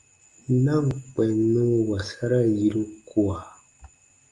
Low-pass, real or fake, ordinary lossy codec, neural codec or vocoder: 10.8 kHz; real; Opus, 32 kbps; none